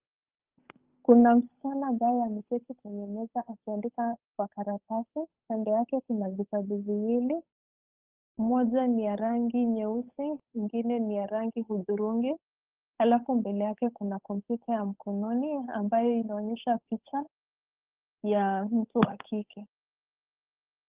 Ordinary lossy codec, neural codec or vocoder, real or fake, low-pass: Opus, 24 kbps; codec, 16 kHz, 8 kbps, FunCodec, trained on Chinese and English, 25 frames a second; fake; 3.6 kHz